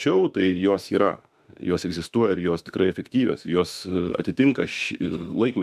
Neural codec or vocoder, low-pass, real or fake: autoencoder, 48 kHz, 32 numbers a frame, DAC-VAE, trained on Japanese speech; 14.4 kHz; fake